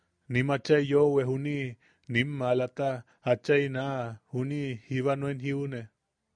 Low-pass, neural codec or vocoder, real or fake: 9.9 kHz; none; real